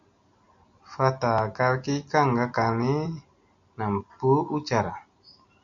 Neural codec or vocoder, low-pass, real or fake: none; 7.2 kHz; real